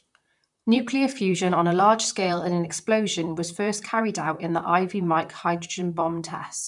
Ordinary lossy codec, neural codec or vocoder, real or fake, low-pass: none; vocoder, 44.1 kHz, 128 mel bands, Pupu-Vocoder; fake; 10.8 kHz